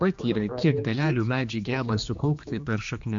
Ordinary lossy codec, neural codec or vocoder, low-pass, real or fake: MP3, 48 kbps; codec, 16 kHz, 2 kbps, X-Codec, HuBERT features, trained on general audio; 7.2 kHz; fake